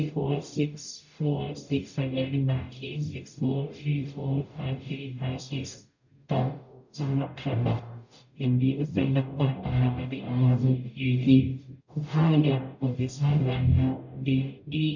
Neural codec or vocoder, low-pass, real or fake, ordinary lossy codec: codec, 44.1 kHz, 0.9 kbps, DAC; 7.2 kHz; fake; none